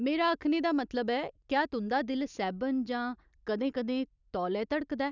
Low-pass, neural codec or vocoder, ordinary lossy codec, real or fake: 7.2 kHz; none; none; real